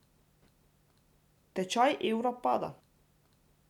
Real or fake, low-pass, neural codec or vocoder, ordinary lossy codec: real; 19.8 kHz; none; none